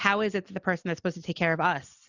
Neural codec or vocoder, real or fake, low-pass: none; real; 7.2 kHz